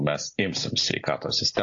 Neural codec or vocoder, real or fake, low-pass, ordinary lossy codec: none; real; 7.2 kHz; AAC, 48 kbps